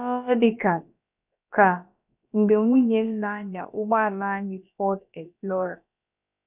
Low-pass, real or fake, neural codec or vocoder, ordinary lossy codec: 3.6 kHz; fake; codec, 16 kHz, about 1 kbps, DyCAST, with the encoder's durations; none